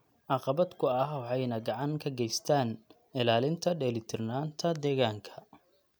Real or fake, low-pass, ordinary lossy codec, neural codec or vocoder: real; none; none; none